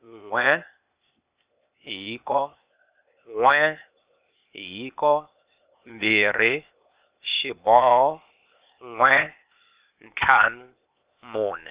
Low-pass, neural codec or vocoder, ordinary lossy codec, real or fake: 3.6 kHz; codec, 16 kHz, 0.8 kbps, ZipCodec; Opus, 64 kbps; fake